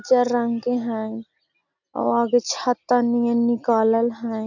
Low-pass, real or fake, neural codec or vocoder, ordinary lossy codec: 7.2 kHz; real; none; none